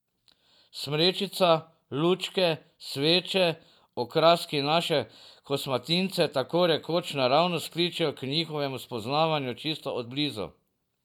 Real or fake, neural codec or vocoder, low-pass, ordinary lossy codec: real; none; 19.8 kHz; none